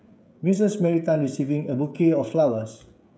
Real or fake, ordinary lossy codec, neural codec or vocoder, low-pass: fake; none; codec, 16 kHz, 16 kbps, FreqCodec, smaller model; none